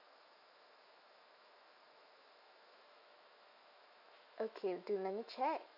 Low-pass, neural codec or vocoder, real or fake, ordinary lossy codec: 5.4 kHz; autoencoder, 48 kHz, 128 numbers a frame, DAC-VAE, trained on Japanese speech; fake; none